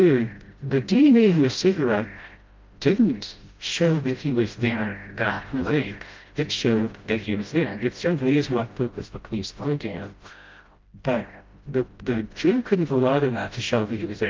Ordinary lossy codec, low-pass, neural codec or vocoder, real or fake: Opus, 24 kbps; 7.2 kHz; codec, 16 kHz, 0.5 kbps, FreqCodec, smaller model; fake